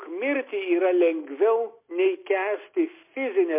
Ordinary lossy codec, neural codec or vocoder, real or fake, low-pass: MP3, 24 kbps; none; real; 3.6 kHz